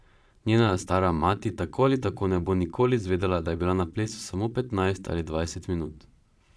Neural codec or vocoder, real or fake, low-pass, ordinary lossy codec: vocoder, 22.05 kHz, 80 mel bands, Vocos; fake; none; none